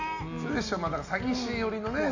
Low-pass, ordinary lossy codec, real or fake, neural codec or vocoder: 7.2 kHz; AAC, 48 kbps; real; none